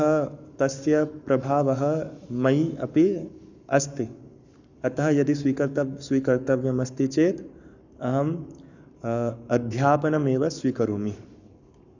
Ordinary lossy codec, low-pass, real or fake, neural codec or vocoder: none; 7.2 kHz; fake; codec, 44.1 kHz, 7.8 kbps, Pupu-Codec